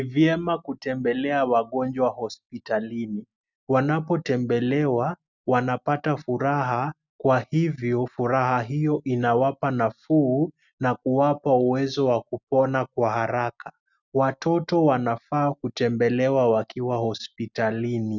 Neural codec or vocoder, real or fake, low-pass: none; real; 7.2 kHz